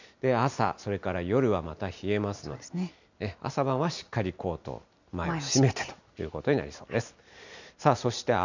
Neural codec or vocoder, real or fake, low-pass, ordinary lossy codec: none; real; 7.2 kHz; none